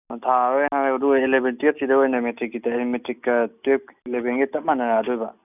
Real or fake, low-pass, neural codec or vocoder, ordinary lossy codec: real; 3.6 kHz; none; none